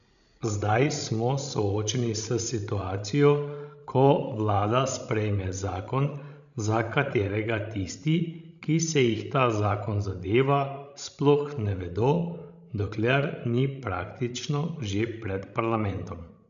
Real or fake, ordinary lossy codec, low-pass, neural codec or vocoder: fake; none; 7.2 kHz; codec, 16 kHz, 16 kbps, FreqCodec, larger model